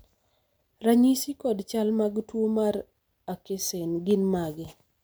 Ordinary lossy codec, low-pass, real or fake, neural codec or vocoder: none; none; real; none